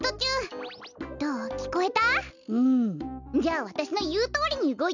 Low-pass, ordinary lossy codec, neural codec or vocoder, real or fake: 7.2 kHz; none; none; real